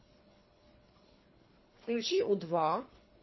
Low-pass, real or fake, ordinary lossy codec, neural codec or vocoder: 7.2 kHz; fake; MP3, 24 kbps; codec, 24 kHz, 3 kbps, HILCodec